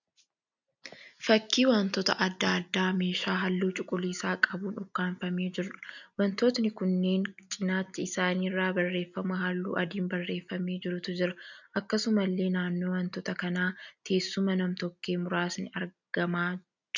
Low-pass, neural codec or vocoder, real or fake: 7.2 kHz; none; real